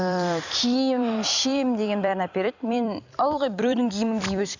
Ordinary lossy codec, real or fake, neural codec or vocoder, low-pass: none; fake; vocoder, 44.1 kHz, 128 mel bands every 256 samples, BigVGAN v2; 7.2 kHz